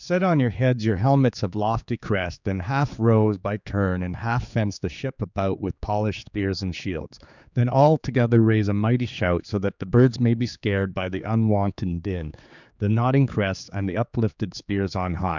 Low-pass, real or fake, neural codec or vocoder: 7.2 kHz; fake; codec, 16 kHz, 4 kbps, X-Codec, HuBERT features, trained on general audio